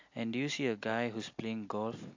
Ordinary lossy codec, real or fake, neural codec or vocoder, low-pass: AAC, 48 kbps; real; none; 7.2 kHz